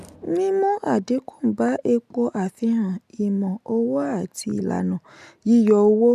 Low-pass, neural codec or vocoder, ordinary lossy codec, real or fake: 14.4 kHz; none; none; real